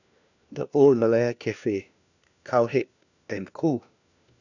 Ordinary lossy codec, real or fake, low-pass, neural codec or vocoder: none; fake; 7.2 kHz; codec, 16 kHz, 1 kbps, FunCodec, trained on LibriTTS, 50 frames a second